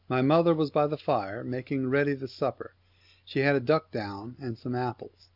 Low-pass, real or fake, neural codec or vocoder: 5.4 kHz; real; none